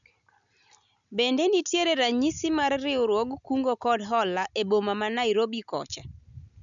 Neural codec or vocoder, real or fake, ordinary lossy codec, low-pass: none; real; none; 7.2 kHz